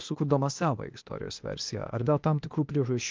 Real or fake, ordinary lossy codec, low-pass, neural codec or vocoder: fake; Opus, 24 kbps; 7.2 kHz; codec, 16 kHz, 0.8 kbps, ZipCodec